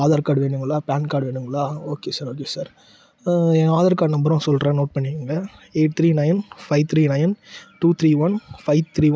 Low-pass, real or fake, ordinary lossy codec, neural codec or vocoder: none; real; none; none